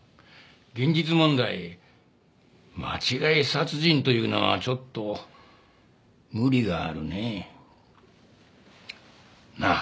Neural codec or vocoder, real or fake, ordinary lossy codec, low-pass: none; real; none; none